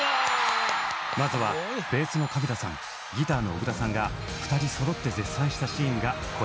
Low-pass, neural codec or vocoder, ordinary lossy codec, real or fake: none; none; none; real